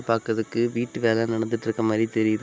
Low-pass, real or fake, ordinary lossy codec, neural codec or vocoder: none; real; none; none